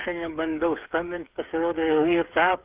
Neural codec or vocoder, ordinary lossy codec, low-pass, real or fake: codec, 16 kHz, 8 kbps, FreqCodec, smaller model; Opus, 32 kbps; 3.6 kHz; fake